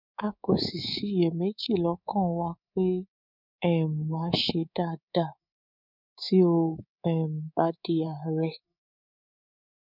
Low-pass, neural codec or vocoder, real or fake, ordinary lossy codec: 5.4 kHz; codec, 24 kHz, 3.1 kbps, DualCodec; fake; none